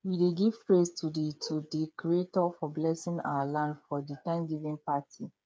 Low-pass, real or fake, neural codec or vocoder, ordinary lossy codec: none; fake; codec, 16 kHz, 8 kbps, FreqCodec, smaller model; none